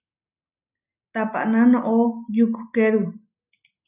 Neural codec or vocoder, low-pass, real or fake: none; 3.6 kHz; real